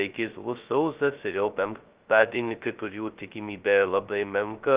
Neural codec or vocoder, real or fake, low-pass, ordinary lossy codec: codec, 16 kHz, 0.2 kbps, FocalCodec; fake; 3.6 kHz; Opus, 32 kbps